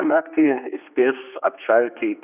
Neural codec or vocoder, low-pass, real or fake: codec, 16 kHz, 2 kbps, X-Codec, HuBERT features, trained on general audio; 3.6 kHz; fake